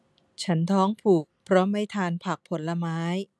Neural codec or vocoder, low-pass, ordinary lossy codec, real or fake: none; none; none; real